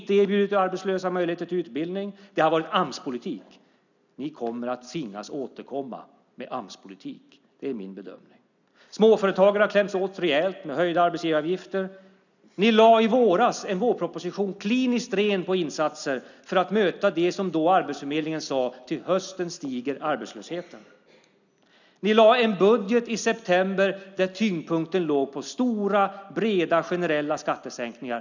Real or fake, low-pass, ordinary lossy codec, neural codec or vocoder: real; 7.2 kHz; none; none